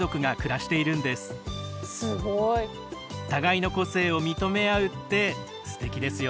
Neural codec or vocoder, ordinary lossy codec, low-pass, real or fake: none; none; none; real